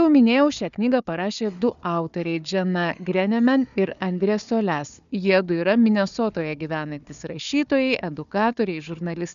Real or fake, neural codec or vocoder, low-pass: fake; codec, 16 kHz, 4 kbps, FunCodec, trained on Chinese and English, 50 frames a second; 7.2 kHz